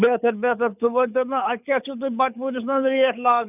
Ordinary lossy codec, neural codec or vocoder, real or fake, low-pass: none; codec, 24 kHz, 6 kbps, HILCodec; fake; 3.6 kHz